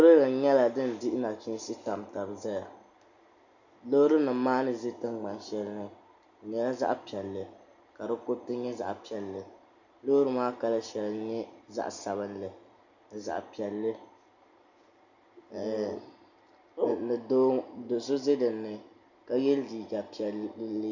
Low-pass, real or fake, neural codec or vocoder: 7.2 kHz; real; none